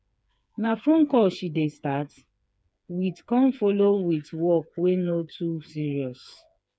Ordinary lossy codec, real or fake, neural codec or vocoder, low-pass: none; fake; codec, 16 kHz, 4 kbps, FreqCodec, smaller model; none